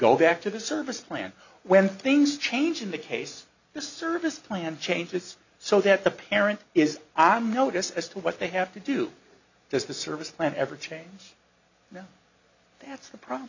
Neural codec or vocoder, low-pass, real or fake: none; 7.2 kHz; real